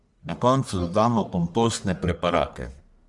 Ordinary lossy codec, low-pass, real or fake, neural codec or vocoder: none; 10.8 kHz; fake; codec, 44.1 kHz, 1.7 kbps, Pupu-Codec